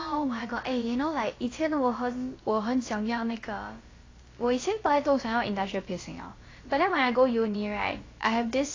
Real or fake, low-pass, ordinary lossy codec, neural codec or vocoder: fake; 7.2 kHz; AAC, 32 kbps; codec, 16 kHz, about 1 kbps, DyCAST, with the encoder's durations